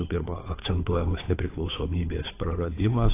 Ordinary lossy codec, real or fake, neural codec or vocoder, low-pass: AAC, 24 kbps; fake; codec, 16 kHz, 8 kbps, FunCodec, trained on Chinese and English, 25 frames a second; 3.6 kHz